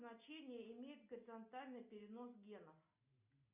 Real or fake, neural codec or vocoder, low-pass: real; none; 3.6 kHz